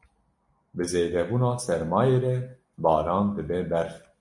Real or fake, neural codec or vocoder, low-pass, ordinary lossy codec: real; none; 10.8 kHz; MP3, 48 kbps